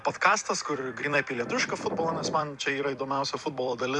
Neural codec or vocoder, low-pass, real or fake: vocoder, 44.1 kHz, 128 mel bands every 256 samples, BigVGAN v2; 10.8 kHz; fake